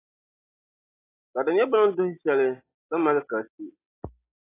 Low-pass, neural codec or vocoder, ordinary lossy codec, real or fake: 3.6 kHz; none; AAC, 16 kbps; real